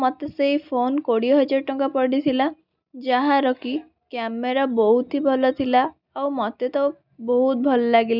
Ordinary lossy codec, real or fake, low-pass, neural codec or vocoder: none; real; 5.4 kHz; none